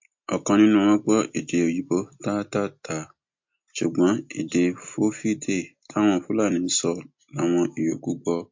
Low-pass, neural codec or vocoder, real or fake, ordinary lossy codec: 7.2 kHz; none; real; MP3, 48 kbps